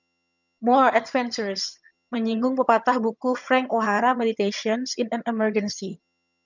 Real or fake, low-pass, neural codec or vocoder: fake; 7.2 kHz; vocoder, 22.05 kHz, 80 mel bands, HiFi-GAN